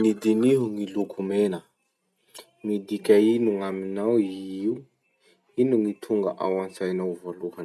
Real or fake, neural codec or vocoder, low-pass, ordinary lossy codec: real; none; none; none